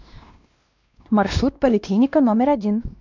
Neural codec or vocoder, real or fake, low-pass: codec, 16 kHz, 1 kbps, X-Codec, WavLM features, trained on Multilingual LibriSpeech; fake; 7.2 kHz